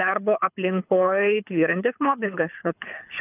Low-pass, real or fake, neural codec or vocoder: 3.6 kHz; fake; codec, 24 kHz, 6 kbps, HILCodec